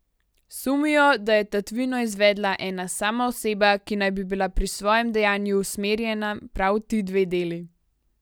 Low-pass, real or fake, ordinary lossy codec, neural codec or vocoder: none; real; none; none